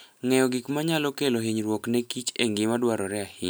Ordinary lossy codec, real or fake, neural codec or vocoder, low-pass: none; real; none; none